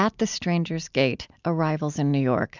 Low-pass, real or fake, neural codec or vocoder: 7.2 kHz; real; none